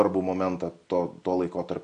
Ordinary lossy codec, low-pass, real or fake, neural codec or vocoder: MP3, 48 kbps; 9.9 kHz; real; none